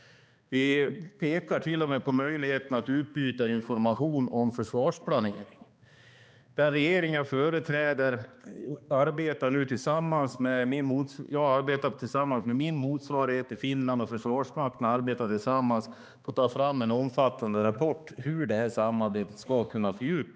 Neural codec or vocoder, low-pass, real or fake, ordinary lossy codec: codec, 16 kHz, 2 kbps, X-Codec, HuBERT features, trained on balanced general audio; none; fake; none